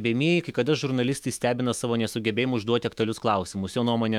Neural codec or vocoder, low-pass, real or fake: autoencoder, 48 kHz, 128 numbers a frame, DAC-VAE, trained on Japanese speech; 19.8 kHz; fake